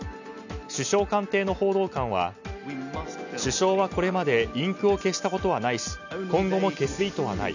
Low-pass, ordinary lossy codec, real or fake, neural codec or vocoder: 7.2 kHz; none; real; none